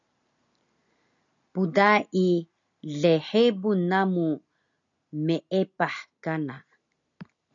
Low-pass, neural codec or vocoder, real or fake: 7.2 kHz; none; real